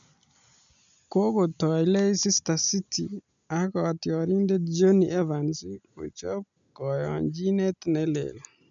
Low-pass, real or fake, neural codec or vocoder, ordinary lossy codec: 7.2 kHz; real; none; none